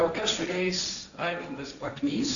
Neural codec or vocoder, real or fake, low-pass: codec, 16 kHz, 1.1 kbps, Voila-Tokenizer; fake; 7.2 kHz